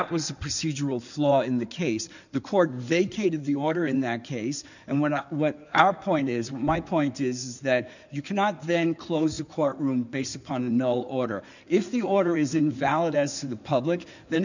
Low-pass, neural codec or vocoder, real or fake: 7.2 kHz; codec, 16 kHz in and 24 kHz out, 2.2 kbps, FireRedTTS-2 codec; fake